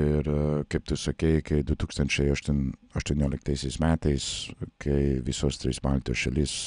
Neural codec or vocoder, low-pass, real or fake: none; 9.9 kHz; real